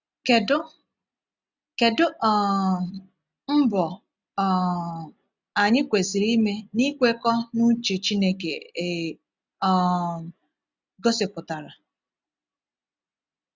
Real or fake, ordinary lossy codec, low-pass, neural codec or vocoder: real; none; none; none